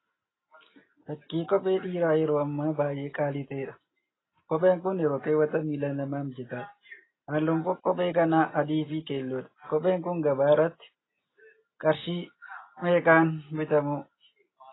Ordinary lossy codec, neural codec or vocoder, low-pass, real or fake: AAC, 16 kbps; none; 7.2 kHz; real